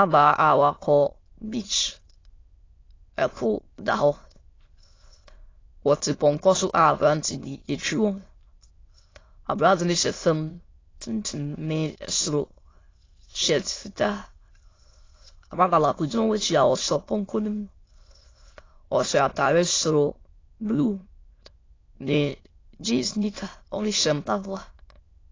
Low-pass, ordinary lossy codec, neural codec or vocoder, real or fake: 7.2 kHz; AAC, 32 kbps; autoencoder, 22.05 kHz, a latent of 192 numbers a frame, VITS, trained on many speakers; fake